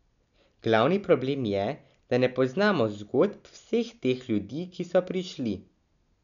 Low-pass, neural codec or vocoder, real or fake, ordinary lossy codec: 7.2 kHz; none; real; none